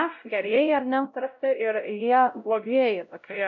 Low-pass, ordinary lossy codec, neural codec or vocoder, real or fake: 7.2 kHz; MP3, 64 kbps; codec, 16 kHz, 0.5 kbps, X-Codec, WavLM features, trained on Multilingual LibriSpeech; fake